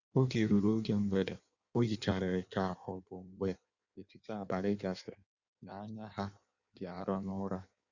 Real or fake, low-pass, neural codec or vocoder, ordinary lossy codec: fake; 7.2 kHz; codec, 16 kHz in and 24 kHz out, 1.1 kbps, FireRedTTS-2 codec; Opus, 64 kbps